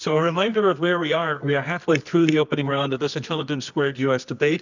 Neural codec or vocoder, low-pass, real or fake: codec, 24 kHz, 0.9 kbps, WavTokenizer, medium music audio release; 7.2 kHz; fake